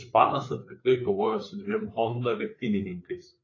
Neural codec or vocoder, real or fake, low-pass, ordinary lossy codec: codec, 16 kHz, 4 kbps, FreqCodec, larger model; fake; 7.2 kHz; AAC, 32 kbps